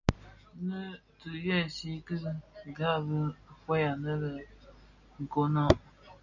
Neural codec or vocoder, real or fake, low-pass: none; real; 7.2 kHz